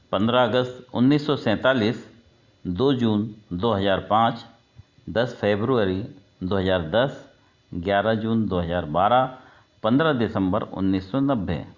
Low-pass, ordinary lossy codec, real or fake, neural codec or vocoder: 7.2 kHz; Opus, 64 kbps; real; none